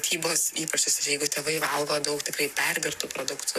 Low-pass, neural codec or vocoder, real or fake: 14.4 kHz; codec, 44.1 kHz, 7.8 kbps, Pupu-Codec; fake